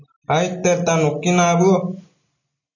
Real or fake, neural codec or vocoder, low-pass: real; none; 7.2 kHz